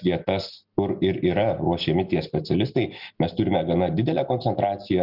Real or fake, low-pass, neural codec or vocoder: real; 5.4 kHz; none